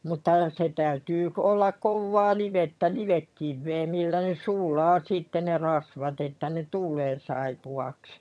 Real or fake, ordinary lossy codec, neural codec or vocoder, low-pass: fake; none; vocoder, 22.05 kHz, 80 mel bands, HiFi-GAN; none